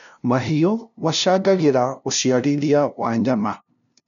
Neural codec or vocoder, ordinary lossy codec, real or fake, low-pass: codec, 16 kHz, 0.5 kbps, FunCodec, trained on LibriTTS, 25 frames a second; none; fake; 7.2 kHz